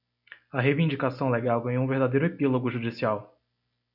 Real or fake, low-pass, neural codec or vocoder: real; 5.4 kHz; none